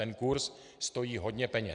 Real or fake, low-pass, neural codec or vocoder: real; 9.9 kHz; none